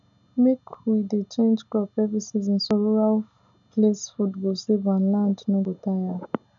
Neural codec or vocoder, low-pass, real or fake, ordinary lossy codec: none; 7.2 kHz; real; none